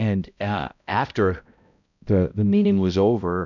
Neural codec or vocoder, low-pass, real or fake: codec, 16 kHz, 0.5 kbps, X-Codec, HuBERT features, trained on balanced general audio; 7.2 kHz; fake